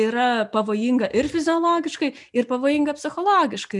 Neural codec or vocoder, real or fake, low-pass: none; real; 10.8 kHz